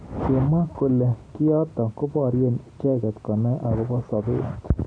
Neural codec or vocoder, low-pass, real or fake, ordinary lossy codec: none; 9.9 kHz; real; none